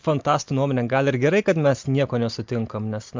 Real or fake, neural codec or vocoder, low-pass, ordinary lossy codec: real; none; 7.2 kHz; MP3, 64 kbps